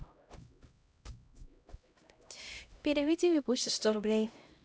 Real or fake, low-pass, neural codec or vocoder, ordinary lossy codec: fake; none; codec, 16 kHz, 0.5 kbps, X-Codec, HuBERT features, trained on LibriSpeech; none